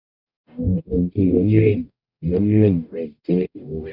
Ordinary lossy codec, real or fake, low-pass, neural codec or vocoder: none; fake; 5.4 kHz; codec, 44.1 kHz, 0.9 kbps, DAC